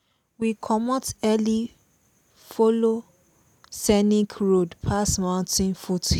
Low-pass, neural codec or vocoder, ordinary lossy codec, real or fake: 19.8 kHz; none; none; real